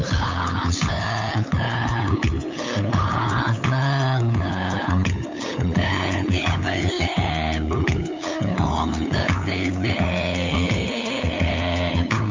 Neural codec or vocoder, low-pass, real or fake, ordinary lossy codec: codec, 16 kHz, 8 kbps, FunCodec, trained on LibriTTS, 25 frames a second; 7.2 kHz; fake; MP3, 48 kbps